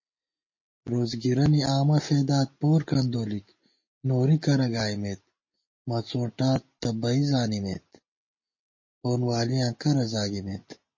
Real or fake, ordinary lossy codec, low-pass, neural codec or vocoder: real; MP3, 32 kbps; 7.2 kHz; none